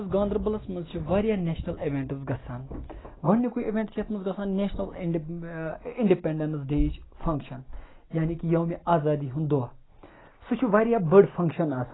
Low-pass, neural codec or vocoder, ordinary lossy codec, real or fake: 7.2 kHz; none; AAC, 16 kbps; real